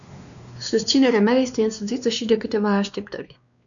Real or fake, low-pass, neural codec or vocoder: fake; 7.2 kHz; codec, 16 kHz, 2 kbps, X-Codec, WavLM features, trained on Multilingual LibriSpeech